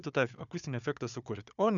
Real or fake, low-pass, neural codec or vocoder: fake; 7.2 kHz; codec, 16 kHz, 4 kbps, FunCodec, trained on Chinese and English, 50 frames a second